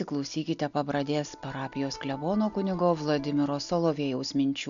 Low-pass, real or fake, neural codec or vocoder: 7.2 kHz; real; none